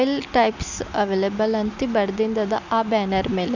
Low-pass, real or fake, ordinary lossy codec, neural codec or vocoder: 7.2 kHz; real; none; none